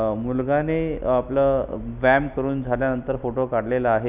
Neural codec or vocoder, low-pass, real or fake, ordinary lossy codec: none; 3.6 kHz; real; none